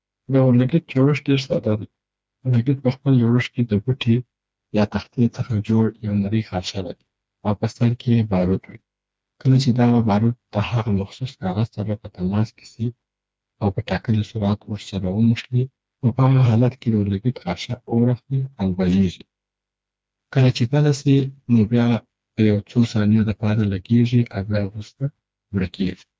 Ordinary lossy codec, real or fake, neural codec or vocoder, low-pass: none; fake; codec, 16 kHz, 2 kbps, FreqCodec, smaller model; none